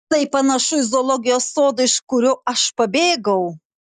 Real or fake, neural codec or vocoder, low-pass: real; none; 14.4 kHz